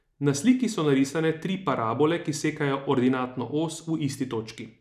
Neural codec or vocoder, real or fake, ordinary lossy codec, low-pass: none; real; none; 14.4 kHz